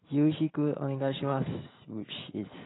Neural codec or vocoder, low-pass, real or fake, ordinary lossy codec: none; 7.2 kHz; real; AAC, 16 kbps